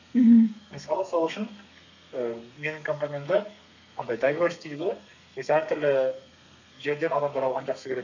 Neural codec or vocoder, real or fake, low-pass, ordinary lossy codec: codec, 32 kHz, 1.9 kbps, SNAC; fake; 7.2 kHz; none